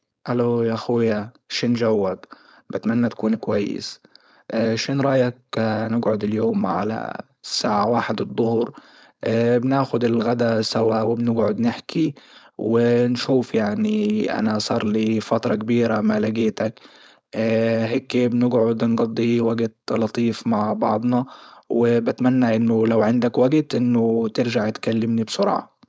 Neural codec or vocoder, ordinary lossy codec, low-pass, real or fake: codec, 16 kHz, 4.8 kbps, FACodec; none; none; fake